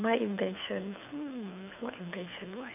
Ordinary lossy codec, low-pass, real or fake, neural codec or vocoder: none; 3.6 kHz; fake; codec, 24 kHz, 6 kbps, HILCodec